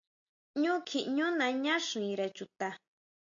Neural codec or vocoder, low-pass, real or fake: none; 7.2 kHz; real